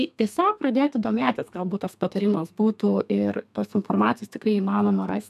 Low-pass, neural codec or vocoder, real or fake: 14.4 kHz; codec, 32 kHz, 1.9 kbps, SNAC; fake